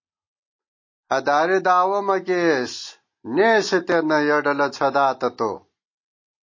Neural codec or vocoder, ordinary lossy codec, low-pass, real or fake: none; MP3, 32 kbps; 7.2 kHz; real